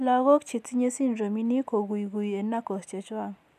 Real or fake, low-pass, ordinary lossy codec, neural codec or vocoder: real; 14.4 kHz; none; none